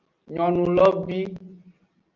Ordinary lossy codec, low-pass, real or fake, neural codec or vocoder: Opus, 24 kbps; 7.2 kHz; real; none